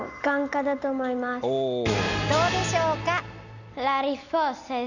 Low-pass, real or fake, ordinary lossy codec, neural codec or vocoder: 7.2 kHz; real; none; none